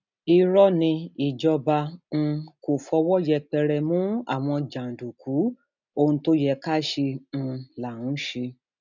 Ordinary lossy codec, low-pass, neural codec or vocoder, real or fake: none; 7.2 kHz; none; real